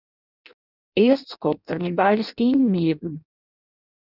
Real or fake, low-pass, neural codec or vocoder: fake; 5.4 kHz; codec, 16 kHz in and 24 kHz out, 0.6 kbps, FireRedTTS-2 codec